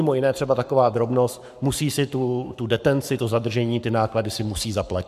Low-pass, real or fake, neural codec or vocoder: 14.4 kHz; fake; codec, 44.1 kHz, 7.8 kbps, Pupu-Codec